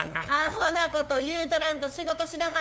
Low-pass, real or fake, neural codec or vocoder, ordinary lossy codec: none; fake; codec, 16 kHz, 2 kbps, FunCodec, trained on LibriTTS, 25 frames a second; none